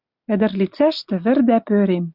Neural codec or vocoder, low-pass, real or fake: none; 5.4 kHz; real